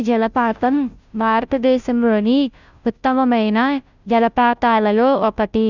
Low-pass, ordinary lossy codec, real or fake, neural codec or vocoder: 7.2 kHz; none; fake; codec, 16 kHz, 0.5 kbps, FunCodec, trained on Chinese and English, 25 frames a second